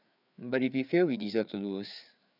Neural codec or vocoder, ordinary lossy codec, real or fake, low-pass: codec, 16 kHz, 4 kbps, FreqCodec, larger model; none; fake; 5.4 kHz